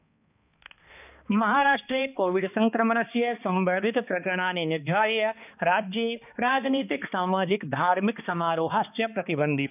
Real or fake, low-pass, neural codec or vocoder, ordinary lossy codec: fake; 3.6 kHz; codec, 16 kHz, 2 kbps, X-Codec, HuBERT features, trained on balanced general audio; none